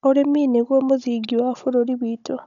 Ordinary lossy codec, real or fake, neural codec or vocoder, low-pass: none; fake; codec, 16 kHz, 16 kbps, FunCodec, trained on Chinese and English, 50 frames a second; 7.2 kHz